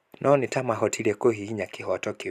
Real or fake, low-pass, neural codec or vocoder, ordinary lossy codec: real; 14.4 kHz; none; none